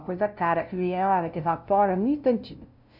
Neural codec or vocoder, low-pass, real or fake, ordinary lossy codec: codec, 16 kHz, 0.5 kbps, FunCodec, trained on LibriTTS, 25 frames a second; 5.4 kHz; fake; none